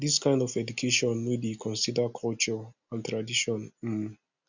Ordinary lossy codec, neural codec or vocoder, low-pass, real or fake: none; none; 7.2 kHz; real